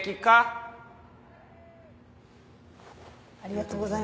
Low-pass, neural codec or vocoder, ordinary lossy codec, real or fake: none; none; none; real